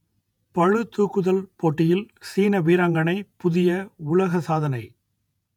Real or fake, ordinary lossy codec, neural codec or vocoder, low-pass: fake; none; vocoder, 48 kHz, 128 mel bands, Vocos; 19.8 kHz